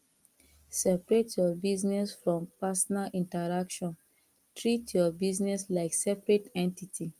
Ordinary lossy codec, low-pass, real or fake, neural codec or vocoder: Opus, 32 kbps; 14.4 kHz; real; none